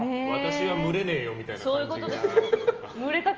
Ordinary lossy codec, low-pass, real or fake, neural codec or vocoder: Opus, 24 kbps; 7.2 kHz; real; none